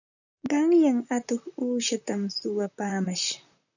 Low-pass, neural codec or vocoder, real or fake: 7.2 kHz; vocoder, 44.1 kHz, 128 mel bands, Pupu-Vocoder; fake